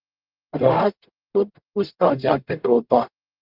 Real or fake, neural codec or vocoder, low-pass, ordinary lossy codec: fake; codec, 44.1 kHz, 0.9 kbps, DAC; 5.4 kHz; Opus, 32 kbps